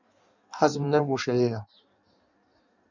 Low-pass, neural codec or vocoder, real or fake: 7.2 kHz; codec, 16 kHz in and 24 kHz out, 1.1 kbps, FireRedTTS-2 codec; fake